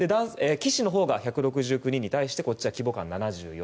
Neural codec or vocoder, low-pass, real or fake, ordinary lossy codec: none; none; real; none